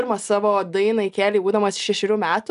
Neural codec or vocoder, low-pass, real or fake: none; 10.8 kHz; real